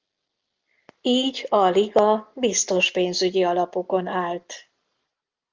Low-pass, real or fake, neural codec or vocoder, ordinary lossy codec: 7.2 kHz; real; none; Opus, 32 kbps